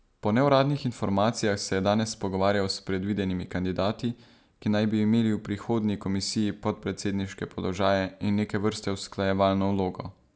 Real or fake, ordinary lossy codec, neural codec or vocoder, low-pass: real; none; none; none